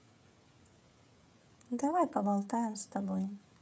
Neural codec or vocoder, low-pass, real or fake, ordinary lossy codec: codec, 16 kHz, 16 kbps, FreqCodec, smaller model; none; fake; none